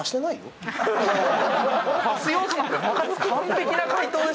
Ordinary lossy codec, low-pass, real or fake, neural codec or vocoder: none; none; real; none